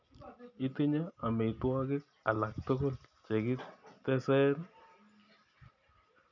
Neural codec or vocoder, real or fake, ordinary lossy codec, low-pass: none; real; none; 7.2 kHz